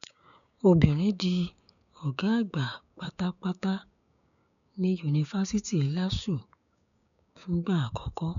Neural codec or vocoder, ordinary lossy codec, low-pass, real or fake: codec, 16 kHz, 6 kbps, DAC; none; 7.2 kHz; fake